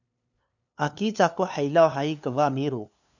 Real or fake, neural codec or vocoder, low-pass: fake; codec, 16 kHz, 2 kbps, FunCodec, trained on LibriTTS, 25 frames a second; 7.2 kHz